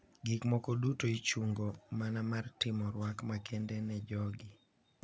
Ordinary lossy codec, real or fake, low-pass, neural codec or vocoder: none; real; none; none